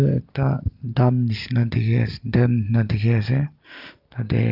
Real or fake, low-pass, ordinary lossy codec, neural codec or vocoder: real; 5.4 kHz; Opus, 24 kbps; none